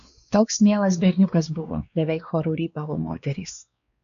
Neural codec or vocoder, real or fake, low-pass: codec, 16 kHz, 2 kbps, X-Codec, WavLM features, trained on Multilingual LibriSpeech; fake; 7.2 kHz